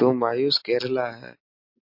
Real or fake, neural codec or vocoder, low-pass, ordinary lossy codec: real; none; 5.4 kHz; MP3, 32 kbps